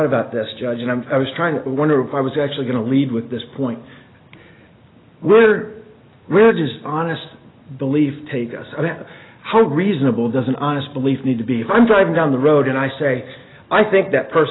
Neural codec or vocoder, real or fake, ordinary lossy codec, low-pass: none; real; AAC, 16 kbps; 7.2 kHz